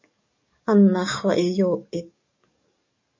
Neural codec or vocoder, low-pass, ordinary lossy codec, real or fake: codec, 44.1 kHz, 7.8 kbps, DAC; 7.2 kHz; MP3, 32 kbps; fake